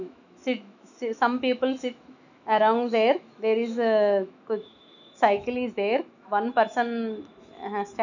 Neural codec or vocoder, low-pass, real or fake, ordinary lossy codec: autoencoder, 48 kHz, 128 numbers a frame, DAC-VAE, trained on Japanese speech; 7.2 kHz; fake; none